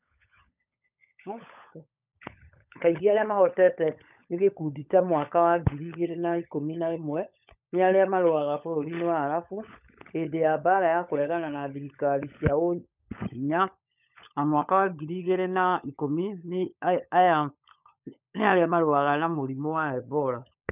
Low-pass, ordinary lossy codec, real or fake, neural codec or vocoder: 3.6 kHz; none; fake; codec, 16 kHz, 16 kbps, FunCodec, trained on LibriTTS, 50 frames a second